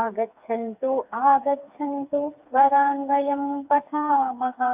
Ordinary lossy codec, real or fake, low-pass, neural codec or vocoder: AAC, 32 kbps; fake; 3.6 kHz; codec, 16 kHz, 4 kbps, FreqCodec, smaller model